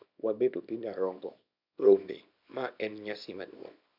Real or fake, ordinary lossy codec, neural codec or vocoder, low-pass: fake; none; codec, 24 kHz, 0.9 kbps, WavTokenizer, small release; 5.4 kHz